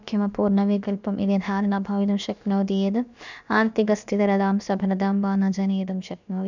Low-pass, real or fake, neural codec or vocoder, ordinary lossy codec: 7.2 kHz; fake; codec, 16 kHz, about 1 kbps, DyCAST, with the encoder's durations; none